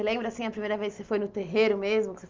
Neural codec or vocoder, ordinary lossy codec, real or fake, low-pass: none; Opus, 32 kbps; real; 7.2 kHz